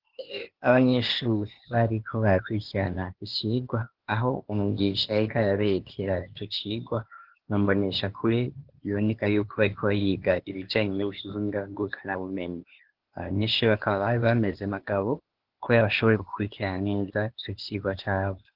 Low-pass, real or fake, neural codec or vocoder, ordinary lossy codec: 5.4 kHz; fake; codec, 16 kHz, 0.8 kbps, ZipCodec; Opus, 16 kbps